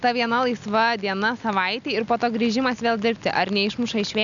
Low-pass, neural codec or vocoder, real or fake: 7.2 kHz; none; real